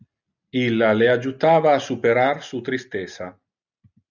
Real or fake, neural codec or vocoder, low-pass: real; none; 7.2 kHz